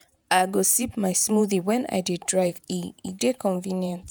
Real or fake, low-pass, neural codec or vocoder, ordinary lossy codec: fake; none; vocoder, 48 kHz, 128 mel bands, Vocos; none